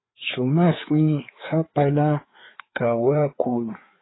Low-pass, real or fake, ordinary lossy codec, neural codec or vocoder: 7.2 kHz; fake; AAC, 16 kbps; codec, 16 kHz, 4 kbps, FreqCodec, larger model